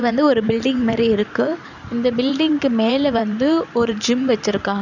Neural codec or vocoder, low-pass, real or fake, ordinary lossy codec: vocoder, 44.1 kHz, 128 mel bands every 512 samples, BigVGAN v2; 7.2 kHz; fake; none